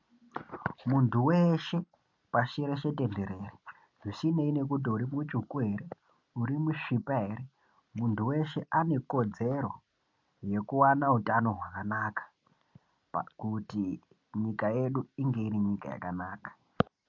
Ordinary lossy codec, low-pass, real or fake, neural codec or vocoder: MP3, 48 kbps; 7.2 kHz; real; none